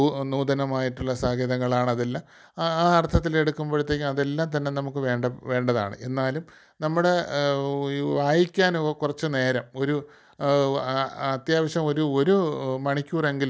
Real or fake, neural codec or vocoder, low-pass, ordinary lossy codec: real; none; none; none